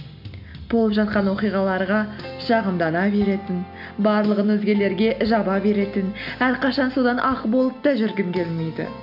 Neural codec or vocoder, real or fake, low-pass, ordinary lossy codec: none; real; 5.4 kHz; MP3, 48 kbps